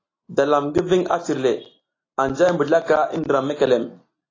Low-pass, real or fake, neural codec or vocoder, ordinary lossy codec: 7.2 kHz; real; none; AAC, 32 kbps